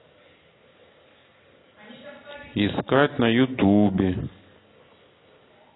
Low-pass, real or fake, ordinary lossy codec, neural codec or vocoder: 7.2 kHz; real; AAC, 16 kbps; none